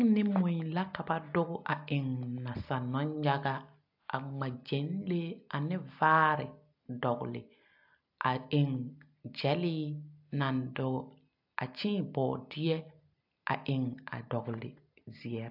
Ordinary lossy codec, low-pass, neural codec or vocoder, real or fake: AAC, 48 kbps; 5.4 kHz; none; real